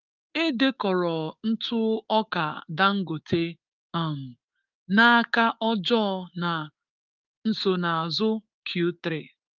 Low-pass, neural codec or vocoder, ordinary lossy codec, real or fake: 7.2 kHz; codec, 16 kHz, 6 kbps, DAC; Opus, 24 kbps; fake